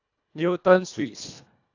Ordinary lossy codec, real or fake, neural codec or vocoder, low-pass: AAC, 48 kbps; fake; codec, 24 kHz, 1.5 kbps, HILCodec; 7.2 kHz